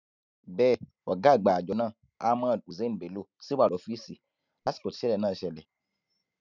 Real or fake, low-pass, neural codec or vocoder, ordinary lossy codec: real; 7.2 kHz; none; none